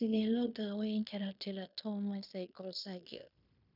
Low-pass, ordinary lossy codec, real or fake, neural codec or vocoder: 5.4 kHz; none; fake; codec, 16 kHz in and 24 kHz out, 0.9 kbps, LongCat-Audio-Codec, fine tuned four codebook decoder